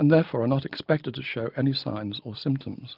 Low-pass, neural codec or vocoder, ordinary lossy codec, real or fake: 5.4 kHz; none; Opus, 24 kbps; real